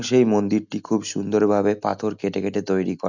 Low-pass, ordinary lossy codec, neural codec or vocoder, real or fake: 7.2 kHz; none; none; real